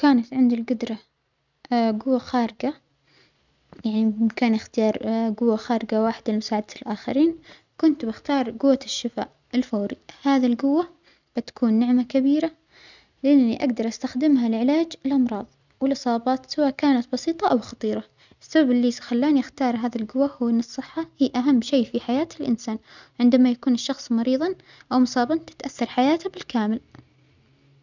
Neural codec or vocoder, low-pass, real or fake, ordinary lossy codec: none; 7.2 kHz; real; none